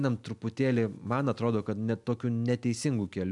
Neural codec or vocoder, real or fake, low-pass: none; real; 10.8 kHz